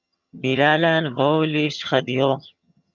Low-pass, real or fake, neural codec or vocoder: 7.2 kHz; fake; vocoder, 22.05 kHz, 80 mel bands, HiFi-GAN